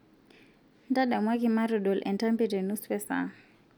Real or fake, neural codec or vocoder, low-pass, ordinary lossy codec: real; none; 19.8 kHz; none